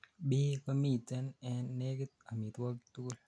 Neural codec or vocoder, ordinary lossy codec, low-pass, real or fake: none; none; 9.9 kHz; real